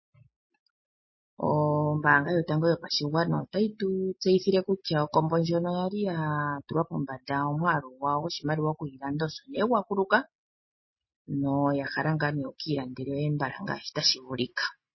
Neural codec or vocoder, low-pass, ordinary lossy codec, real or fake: none; 7.2 kHz; MP3, 24 kbps; real